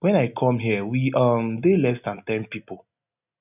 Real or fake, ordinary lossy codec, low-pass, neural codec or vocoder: real; none; 3.6 kHz; none